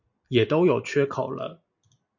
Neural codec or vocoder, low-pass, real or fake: none; 7.2 kHz; real